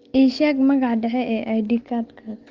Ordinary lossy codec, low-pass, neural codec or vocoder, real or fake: Opus, 32 kbps; 7.2 kHz; none; real